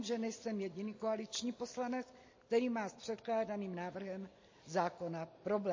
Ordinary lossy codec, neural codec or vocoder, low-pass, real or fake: MP3, 32 kbps; none; 7.2 kHz; real